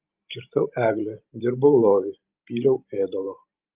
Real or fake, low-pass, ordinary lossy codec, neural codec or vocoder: fake; 3.6 kHz; Opus, 32 kbps; vocoder, 44.1 kHz, 128 mel bands every 512 samples, BigVGAN v2